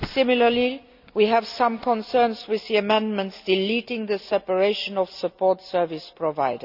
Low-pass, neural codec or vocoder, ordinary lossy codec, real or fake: 5.4 kHz; none; none; real